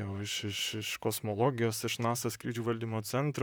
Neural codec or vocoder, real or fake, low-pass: vocoder, 44.1 kHz, 128 mel bands, Pupu-Vocoder; fake; 19.8 kHz